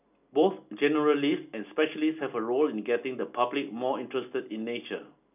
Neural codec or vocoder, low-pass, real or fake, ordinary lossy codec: none; 3.6 kHz; real; none